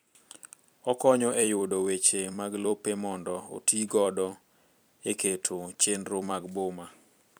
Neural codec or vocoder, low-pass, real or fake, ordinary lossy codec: vocoder, 44.1 kHz, 128 mel bands every 256 samples, BigVGAN v2; none; fake; none